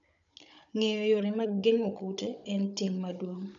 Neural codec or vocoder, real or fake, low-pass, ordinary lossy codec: codec, 16 kHz, 16 kbps, FunCodec, trained on Chinese and English, 50 frames a second; fake; 7.2 kHz; none